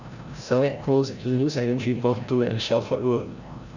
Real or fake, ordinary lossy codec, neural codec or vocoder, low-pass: fake; none; codec, 16 kHz, 0.5 kbps, FreqCodec, larger model; 7.2 kHz